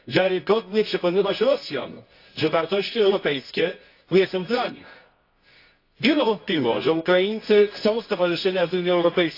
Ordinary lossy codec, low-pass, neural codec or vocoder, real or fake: AAC, 32 kbps; 5.4 kHz; codec, 24 kHz, 0.9 kbps, WavTokenizer, medium music audio release; fake